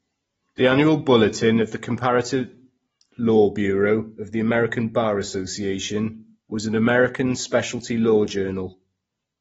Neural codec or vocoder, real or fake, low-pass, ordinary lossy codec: none; real; 19.8 kHz; AAC, 24 kbps